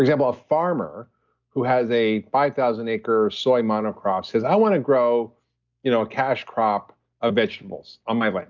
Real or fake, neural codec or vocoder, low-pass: real; none; 7.2 kHz